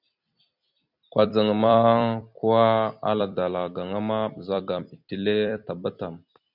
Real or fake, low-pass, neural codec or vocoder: fake; 5.4 kHz; vocoder, 44.1 kHz, 128 mel bands every 256 samples, BigVGAN v2